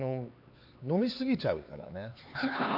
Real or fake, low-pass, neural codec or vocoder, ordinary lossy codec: fake; 5.4 kHz; codec, 16 kHz, 4 kbps, X-Codec, HuBERT features, trained on LibriSpeech; AAC, 48 kbps